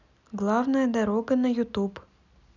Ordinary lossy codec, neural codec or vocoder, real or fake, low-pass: none; none; real; 7.2 kHz